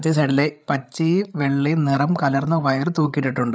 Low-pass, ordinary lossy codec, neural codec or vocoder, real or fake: none; none; codec, 16 kHz, 8 kbps, FreqCodec, larger model; fake